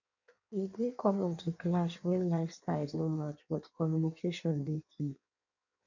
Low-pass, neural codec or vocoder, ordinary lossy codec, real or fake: 7.2 kHz; codec, 16 kHz in and 24 kHz out, 1.1 kbps, FireRedTTS-2 codec; none; fake